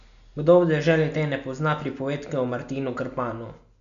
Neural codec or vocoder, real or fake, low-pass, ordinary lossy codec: none; real; 7.2 kHz; none